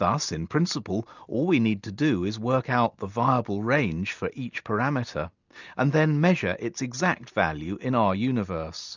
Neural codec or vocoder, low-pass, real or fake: none; 7.2 kHz; real